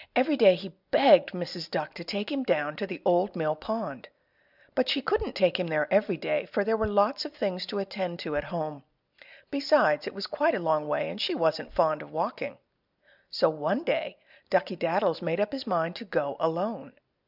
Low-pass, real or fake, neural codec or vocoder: 5.4 kHz; real; none